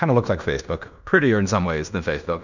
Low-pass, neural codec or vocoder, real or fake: 7.2 kHz; codec, 16 kHz in and 24 kHz out, 0.9 kbps, LongCat-Audio-Codec, fine tuned four codebook decoder; fake